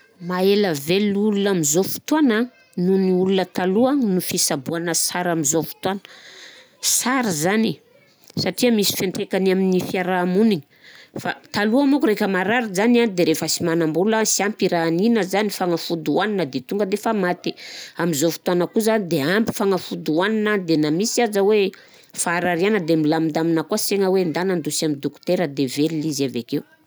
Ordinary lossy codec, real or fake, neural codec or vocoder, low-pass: none; real; none; none